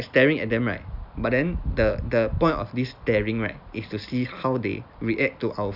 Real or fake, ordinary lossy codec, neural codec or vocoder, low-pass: real; none; none; 5.4 kHz